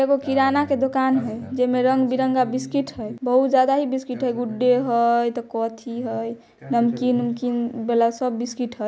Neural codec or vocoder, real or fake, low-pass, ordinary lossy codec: none; real; none; none